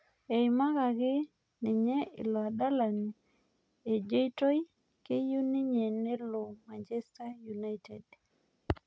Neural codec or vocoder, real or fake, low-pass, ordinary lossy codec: none; real; none; none